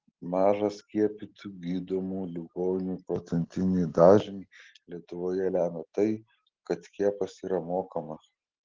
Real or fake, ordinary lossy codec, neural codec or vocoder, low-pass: real; Opus, 16 kbps; none; 7.2 kHz